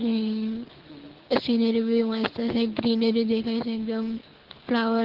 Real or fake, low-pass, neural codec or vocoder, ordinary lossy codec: fake; 5.4 kHz; codec, 24 kHz, 6 kbps, HILCodec; Opus, 16 kbps